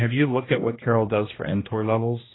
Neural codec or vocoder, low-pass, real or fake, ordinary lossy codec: codec, 16 kHz, 1 kbps, X-Codec, HuBERT features, trained on general audio; 7.2 kHz; fake; AAC, 16 kbps